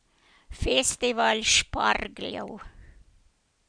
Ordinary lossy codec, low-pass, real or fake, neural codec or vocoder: none; 9.9 kHz; real; none